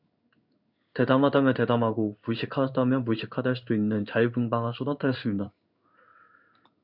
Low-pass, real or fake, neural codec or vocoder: 5.4 kHz; fake; codec, 16 kHz in and 24 kHz out, 1 kbps, XY-Tokenizer